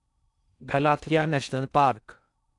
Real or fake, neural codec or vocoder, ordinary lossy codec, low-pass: fake; codec, 16 kHz in and 24 kHz out, 0.6 kbps, FocalCodec, streaming, 4096 codes; none; 10.8 kHz